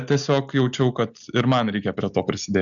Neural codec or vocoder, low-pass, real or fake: none; 7.2 kHz; real